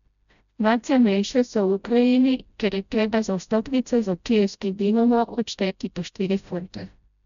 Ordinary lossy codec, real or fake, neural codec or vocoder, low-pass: none; fake; codec, 16 kHz, 0.5 kbps, FreqCodec, smaller model; 7.2 kHz